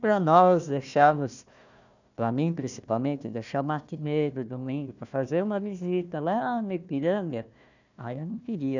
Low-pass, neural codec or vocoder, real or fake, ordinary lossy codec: 7.2 kHz; codec, 16 kHz, 1 kbps, FunCodec, trained on Chinese and English, 50 frames a second; fake; none